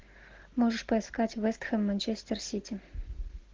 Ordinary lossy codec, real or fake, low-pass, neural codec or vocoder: Opus, 16 kbps; real; 7.2 kHz; none